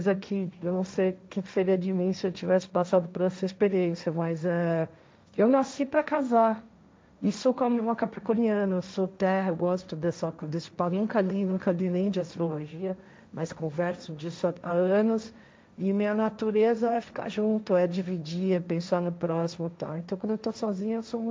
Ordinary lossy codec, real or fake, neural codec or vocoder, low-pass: none; fake; codec, 16 kHz, 1.1 kbps, Voila-Tokenizer; none